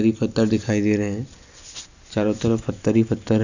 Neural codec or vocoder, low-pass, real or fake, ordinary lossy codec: none; 7.2 kHz; real; none